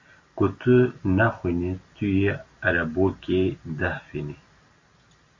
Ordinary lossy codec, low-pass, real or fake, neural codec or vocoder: MP3, 48 kbps; 7.2 kHz; real; none